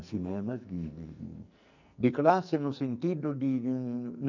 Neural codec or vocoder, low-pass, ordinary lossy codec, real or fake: codec, 44.1 kHz, 2.6 kbps, SNAC; 7.2 kHz; none; fake